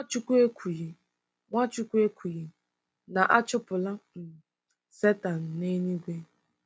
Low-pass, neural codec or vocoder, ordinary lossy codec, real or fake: none; none; none; real